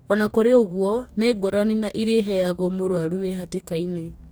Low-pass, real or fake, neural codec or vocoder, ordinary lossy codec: none; fake; codec, 44.1 kHz, 2.6 kbps, DAC; none